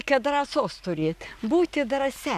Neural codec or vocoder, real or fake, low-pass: none; real; 14.4 kHz